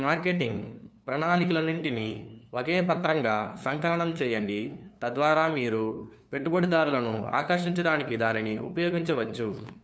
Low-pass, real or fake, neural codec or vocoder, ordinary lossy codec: none; fake; codec, 16 kHz, 2 kbps, FunCodec, trained on LibriTTS, 25 frames a second; none